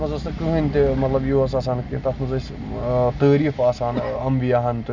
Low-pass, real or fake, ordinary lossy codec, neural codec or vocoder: 7.2 kHz; real; none; none